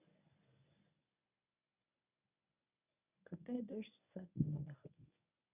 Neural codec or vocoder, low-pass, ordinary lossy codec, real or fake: codec, 24 kHz, 0.9 kbps, WavTokenizer, medium speech release version 1; 3.6 kHz; none; fake